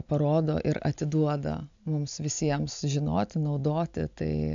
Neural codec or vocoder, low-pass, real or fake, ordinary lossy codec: none; 7.2 kHz; real; AAC, 64 kbps